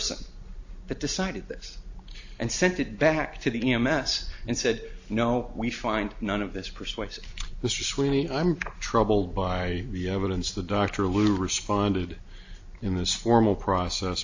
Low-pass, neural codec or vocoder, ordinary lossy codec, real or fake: 7.2 kHz; none; AAC, 48 kbps; real